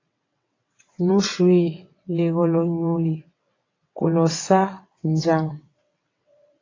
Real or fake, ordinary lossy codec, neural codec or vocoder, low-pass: fake; AAC, 32 kbps; vocoder, 22.05 kHz, 80 mel bands, WaveNeXt; 7.2 kHz